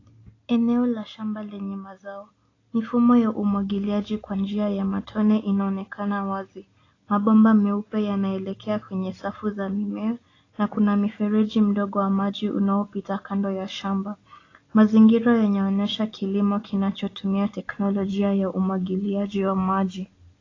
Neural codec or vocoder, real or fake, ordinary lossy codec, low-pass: none; real; AAC, 32 kbps; 7.2 kHz